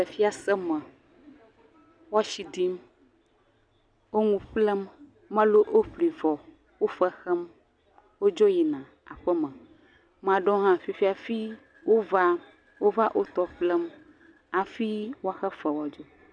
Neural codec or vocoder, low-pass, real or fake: none; 9.9 kHz; real